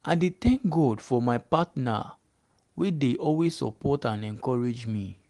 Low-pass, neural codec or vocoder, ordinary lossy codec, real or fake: 10.8 kHz; none; Opus, 24 kbps; real